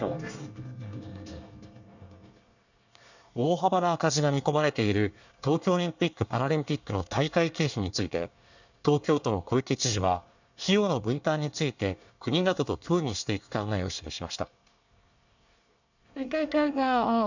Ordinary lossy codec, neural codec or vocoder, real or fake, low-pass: none; codec, 24 kHz, 1 kbps, SNAC; fake; 7.2 kHz